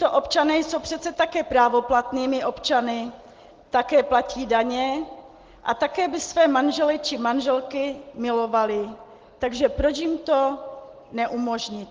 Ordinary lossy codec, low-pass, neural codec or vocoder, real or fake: Opus, 16 kbps; 7.2 kHz; none; real